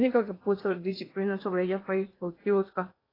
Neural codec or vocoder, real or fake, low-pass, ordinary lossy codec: codec, 16 kHz in and 24 kHz out, 0.8 kbps, FocalCodec, streaming, 65536 codes; fake; 5.4 kHz; AAC, 24 kbps